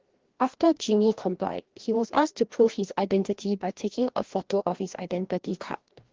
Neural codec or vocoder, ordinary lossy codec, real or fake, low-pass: codec, 16 kHz, 1 kbps, FreqCodec, larger model; Opus, 16 kbps; fake; 7.2 kHz